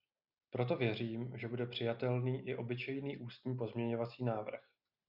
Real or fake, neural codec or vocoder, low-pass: real; none; 5.4 kHz